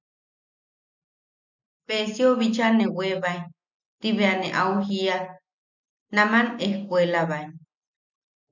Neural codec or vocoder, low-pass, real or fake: none; 7.2 kHz; real